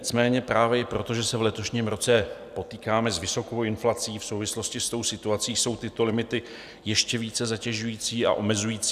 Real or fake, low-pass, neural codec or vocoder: real; 14.4 kHz; none